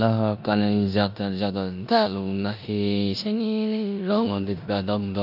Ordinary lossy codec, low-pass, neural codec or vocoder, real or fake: none; 5.4 kHz; codec, 16 kHz in and 24 kHz out, 0.9 kbps, LongCat-Audio-Codec, four codebook decoder; fake